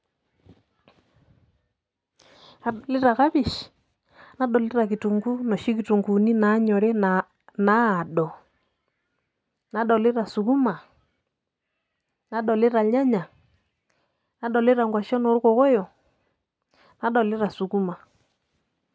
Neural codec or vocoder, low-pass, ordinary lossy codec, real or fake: none; none; none; real